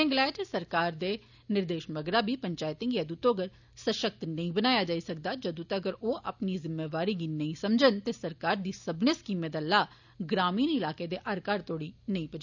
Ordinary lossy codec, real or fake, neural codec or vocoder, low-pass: none; real; none; 7.2 kHz